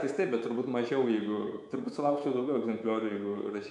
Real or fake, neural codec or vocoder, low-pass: fake; codec, 24 kHz, 3.1 kbps, DualCodec; 10.8 kHz